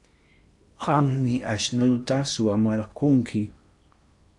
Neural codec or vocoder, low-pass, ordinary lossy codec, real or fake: codec, 16 kHz in and 24 kHz out, 0.8 kbps, FocalCodec, streaming, 65536 codes; 10.8 kHz; AAC, 64 kbps; fake